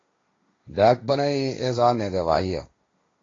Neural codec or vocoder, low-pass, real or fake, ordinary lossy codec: codec, 16 kHz, 1.1 kbps, Voila-Tokenizer; 7.2 kHz; fake; AAC, 32 kbps